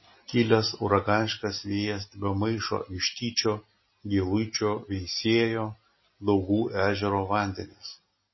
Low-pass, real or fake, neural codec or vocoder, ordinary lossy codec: 7.2 kHz; real; none; MP3, 24 kbps